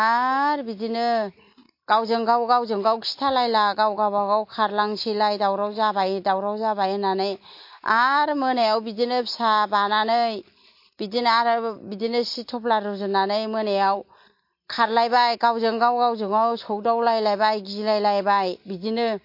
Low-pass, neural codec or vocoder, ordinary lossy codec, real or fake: 5.4 kHz; none; MP3, 32 kbps; real